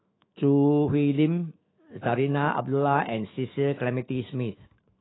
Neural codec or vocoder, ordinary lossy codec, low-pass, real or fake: autoencoder, 48 kHz, 128 numbers a frame, DAC-VAE, trained on Japanese speech; AAC, 16 kbps; 7.2 kHz; fake